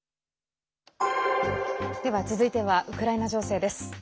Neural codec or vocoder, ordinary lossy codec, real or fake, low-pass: none; none; real; none